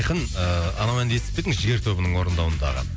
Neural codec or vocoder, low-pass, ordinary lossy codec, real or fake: none; none; none; real